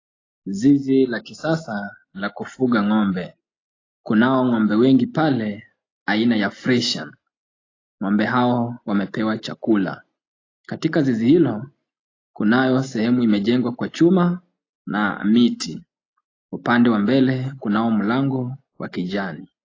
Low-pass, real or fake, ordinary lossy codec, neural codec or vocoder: 7.2 kHz; real; AAC, 32 kbps; none